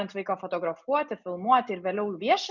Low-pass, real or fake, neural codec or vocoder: 7.2 kHz; real; none